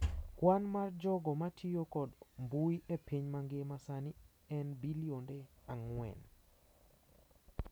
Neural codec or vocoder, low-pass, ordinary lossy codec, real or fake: none; none; none; real